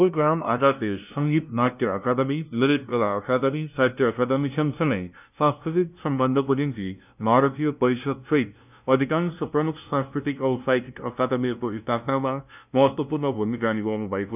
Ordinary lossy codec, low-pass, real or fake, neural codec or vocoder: none; 3.6 kHz; fake; codec, 16 kHz, 0.5 kbps, FunCodec, trained on LibriTTS, 25 frames a second